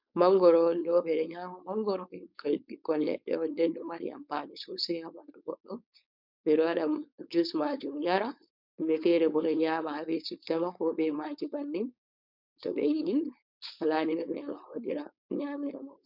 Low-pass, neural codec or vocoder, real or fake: 5.4 kHz; codec, 16 kHz, 4.8 kbps, FACodec; fake